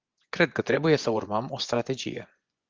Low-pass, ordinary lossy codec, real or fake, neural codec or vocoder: 7.2 kHz; Opus, 32 kbps; fake; vocoder, 24 kHz, 100 mel bands, Vocos